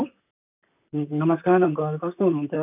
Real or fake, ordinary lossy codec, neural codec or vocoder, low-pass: fake; none; vocoder, 44.1 kHz, 128 mel bands, Pupu-Vocoder; 3.6 kHz